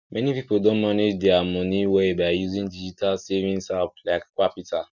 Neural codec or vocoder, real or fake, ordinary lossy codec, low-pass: none; real; none; 7.2 kHz